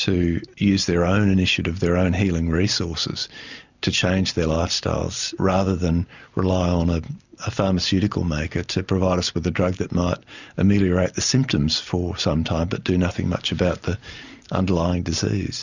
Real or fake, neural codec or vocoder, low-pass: real; none; 7.2 kHz